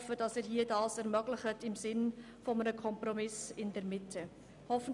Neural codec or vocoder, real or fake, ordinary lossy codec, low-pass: none; real; none; 10.8 kHz